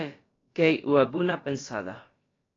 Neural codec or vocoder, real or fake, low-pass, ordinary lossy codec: codec, 16 kHz, about 1 kbps, DyCAST, with the encoder's durations; fake; 7.2 kHz; AAC, 32 kbps